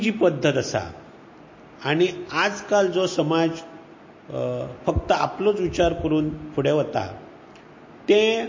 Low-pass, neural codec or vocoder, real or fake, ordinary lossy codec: 7.2 kHz; none; real; MP3, 32 kbps